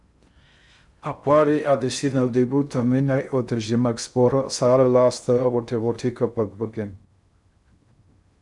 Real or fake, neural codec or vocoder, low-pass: fake; codec, 16 kHz in and 24 kHz out, 0.6 kbps, FocalCodec, streaming, 2048 codes; 10.8 kHz